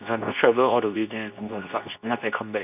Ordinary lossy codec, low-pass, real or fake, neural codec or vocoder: none; 3.6 kHz; fake; codec, 24 kHz, 0.9 kbps, WavTokenizer, medium speech release version 2